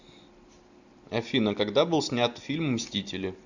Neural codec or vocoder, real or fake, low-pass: none; real; 7.2 kHz